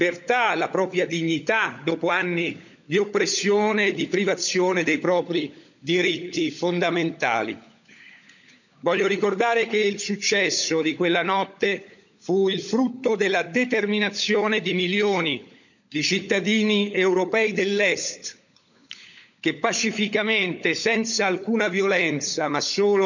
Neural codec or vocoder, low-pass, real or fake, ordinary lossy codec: codec, 16 kHz, 16 kbps, FunCodec, trained on LibriTTS, 50 frames a second; 7.2 kHz; fake; none